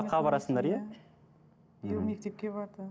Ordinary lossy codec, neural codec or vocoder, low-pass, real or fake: none; none; none; real